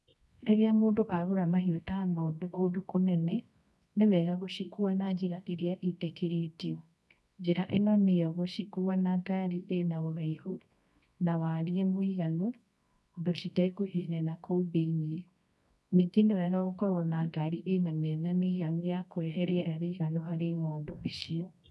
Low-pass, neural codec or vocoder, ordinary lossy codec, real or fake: none; codec, 24 kHz, 0.9 kbps, WavTokenizer, medium music audio release; none; fake